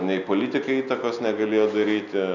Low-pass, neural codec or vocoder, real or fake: 7.2 kHz; none; real